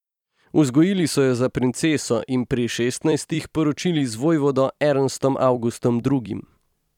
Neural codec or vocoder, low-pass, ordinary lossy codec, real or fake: vocoder, 44.1 kHz, 128 mel bands every 256 samples, BigVGAN v2; 19.8 kHz; none; fake